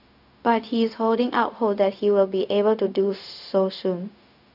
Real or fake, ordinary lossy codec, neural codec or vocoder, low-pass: fake; none; codec, 16 kHz, 0.4 kbps, LongCat-Audio-Codec; 5.4 kHz